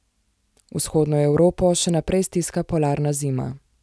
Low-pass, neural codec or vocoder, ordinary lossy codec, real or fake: none; none; none; real